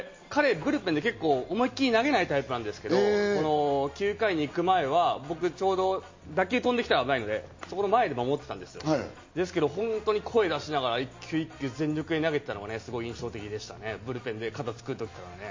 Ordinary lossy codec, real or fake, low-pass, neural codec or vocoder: MP3, 32 kbps; real; 7.2 kHz; none